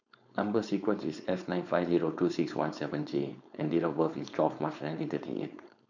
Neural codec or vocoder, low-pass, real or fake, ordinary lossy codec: codec, 16 kHz, 4.8 kbps, FACodec; 7.2 kHz; fake; none